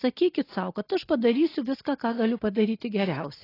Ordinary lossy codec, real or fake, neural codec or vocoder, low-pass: AAC, 24 kbps; real; none; 5.4 kHz